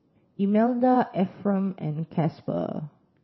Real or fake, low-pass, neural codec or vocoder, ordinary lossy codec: fake; 7.2 kHz; vocoder, 22.05 kHz, 80 mel bands, WaveNeXt; MP3, 24 kbps